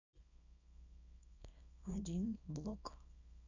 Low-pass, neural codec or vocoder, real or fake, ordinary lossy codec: 7.2 kHz; codec, 24 kHz, 0.9 kbps, WavTokenizer, small release; fake; none